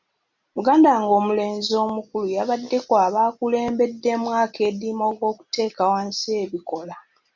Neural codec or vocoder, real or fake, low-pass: none; real; 7.2 kHz